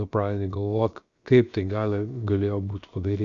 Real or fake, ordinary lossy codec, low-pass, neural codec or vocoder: fake; Opus, 64 kbps; 7.2 kHz; codec, 16 kHz, about 1 kbps, DyCAST, with the encoder's durations